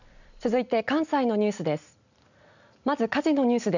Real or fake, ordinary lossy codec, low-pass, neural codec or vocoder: real; none; 7.2 kHz; none